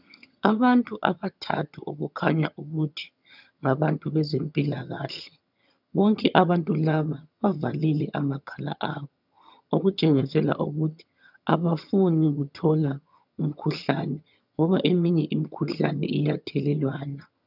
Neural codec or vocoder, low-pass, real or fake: vocoder, 22.05 kHz, 80 mel bands, HiFi-GAN; 5.4 kHz; fake